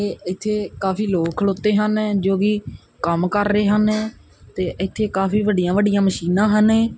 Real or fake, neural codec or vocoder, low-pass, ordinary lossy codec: real; none; none; none